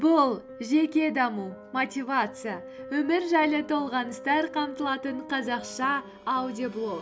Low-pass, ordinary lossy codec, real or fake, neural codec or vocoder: none; none; real; none